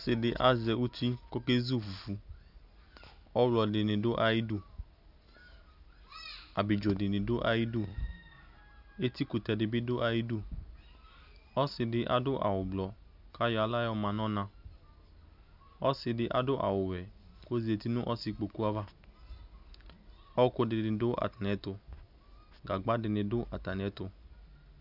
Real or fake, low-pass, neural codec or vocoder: real; 5.4 kHz; none